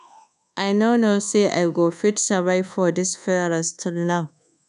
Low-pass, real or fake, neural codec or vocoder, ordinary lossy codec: 10.8 kHz; fake; codec, 24 kHz, 1.2 kbps, DualCodec; none